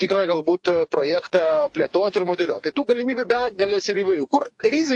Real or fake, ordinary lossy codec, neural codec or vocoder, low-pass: fake; AAC, 64 kbps; codec, 44.1 kHz, 2.6 kbps, DAC; 10.8 kHz